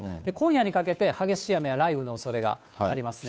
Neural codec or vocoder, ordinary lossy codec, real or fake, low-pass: codec, 16 kHz, 2 kbps, FunCodec, trained on Chinese and English, 25 frames a second; none; fake; none